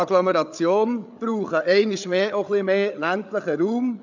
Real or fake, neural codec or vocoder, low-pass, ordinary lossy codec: fake; codec, 16 kHz, 8 kbps, FreqCodec, larger model; 7.2 kHz; none